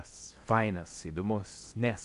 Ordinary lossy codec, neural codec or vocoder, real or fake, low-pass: AAC, 64 kbps; codec, 16 kHz in and 24 kHz out, 0.8 kbps, FocalCodec, streaming, 65536 codes; fake; 10.8 kHz